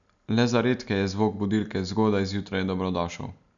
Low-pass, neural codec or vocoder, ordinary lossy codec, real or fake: 7.2 kHz; none; none; real